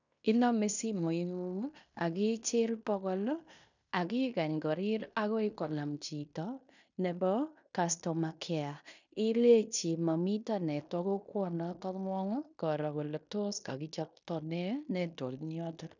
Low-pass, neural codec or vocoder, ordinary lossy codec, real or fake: 7.2 kHz; codec, 16 kHz in and 24 kHz out, 0.9 kbps, LongCat-Audio-Codec, fine tuned four codebook decoder; none; fake